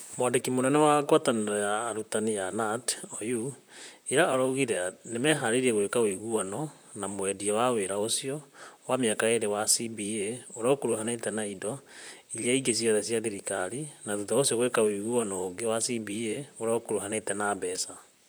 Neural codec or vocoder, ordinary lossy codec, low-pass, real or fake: vocoder, 44.1 kHz, 128 mel bands, Pupu-Vocoder; none; none; fake